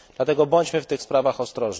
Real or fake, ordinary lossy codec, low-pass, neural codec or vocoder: real; none; none; none